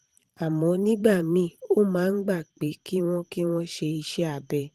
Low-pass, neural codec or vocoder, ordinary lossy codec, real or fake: 14.4 kHz; vocoder, 44.1 kHz, 128 mel bands every 512 samples, BigVGAN v2; Opus, 24 kbps; fake